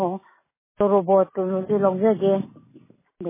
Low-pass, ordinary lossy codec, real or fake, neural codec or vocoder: 3.6 kHz; MP3, 16 kbps; real; none